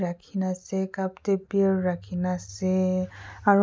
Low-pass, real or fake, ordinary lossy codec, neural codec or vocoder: none; real; none; none